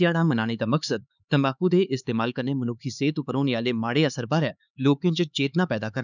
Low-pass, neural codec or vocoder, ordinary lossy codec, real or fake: 7.2 kHz; codec, 16 kHz, 4 kbps, X-Codec, HuBERT features, trained on LibriSpeech; none; fake